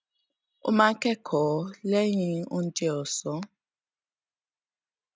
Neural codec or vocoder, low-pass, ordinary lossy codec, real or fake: none; none; none; real